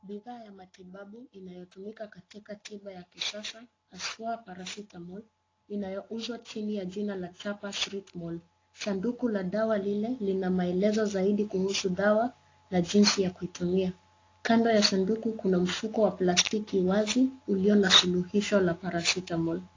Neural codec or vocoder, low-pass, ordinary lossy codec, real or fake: none; 7.2 kHz; AAC, 32 kbps; real